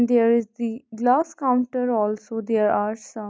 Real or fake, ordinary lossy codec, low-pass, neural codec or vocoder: real; none; none; none